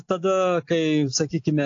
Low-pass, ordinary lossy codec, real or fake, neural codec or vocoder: 7.2 kHz; AAC, 48 kbps; real; none